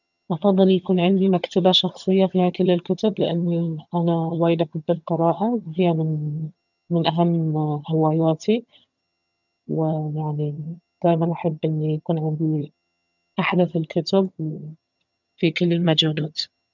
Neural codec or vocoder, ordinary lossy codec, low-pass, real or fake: vocoder, 22.05 kHz, 80 mel bands, HiFi-GAN; none; 7.2 kHz; fake